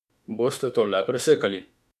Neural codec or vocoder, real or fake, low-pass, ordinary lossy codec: autoencoder, 48 kHz, 32 numbers a frame, DAC-VAE, trained on Japanese speech; fake; 14.4 kHz; none